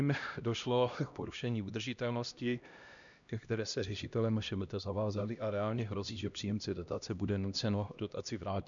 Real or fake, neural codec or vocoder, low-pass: fake; codec, 16 kHz, 1 kbps, X-Codec, HuBERT features, trained on LibriSpeech; 7.2 kHz